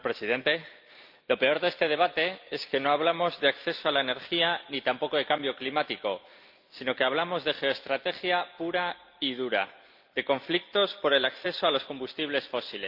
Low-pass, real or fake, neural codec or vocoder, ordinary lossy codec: 5.4 kHz; real; none; Opus, 32 kbps